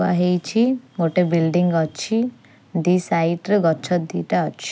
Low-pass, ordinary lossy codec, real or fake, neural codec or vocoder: none; none; real; none